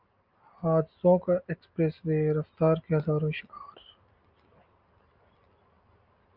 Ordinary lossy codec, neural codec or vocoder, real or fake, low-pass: Opus, 24 kbps; none; real; 5.4 kHz